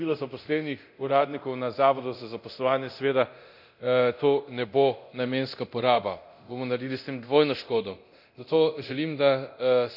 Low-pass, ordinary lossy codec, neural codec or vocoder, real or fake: 5.4 kHz; none; codec, 24 kHz, 0.9 kbps, DualCodec; fake